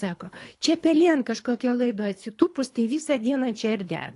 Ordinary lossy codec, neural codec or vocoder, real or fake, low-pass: AAC, 48 kbps; codec, 24 kHz, 3 kbps, HILCodec; fake; 10.8 kHz